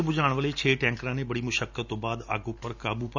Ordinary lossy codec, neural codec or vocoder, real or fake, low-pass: none; none; real; 7.2 kHz